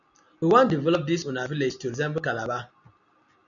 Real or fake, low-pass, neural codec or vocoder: real; 7.2 kHz; none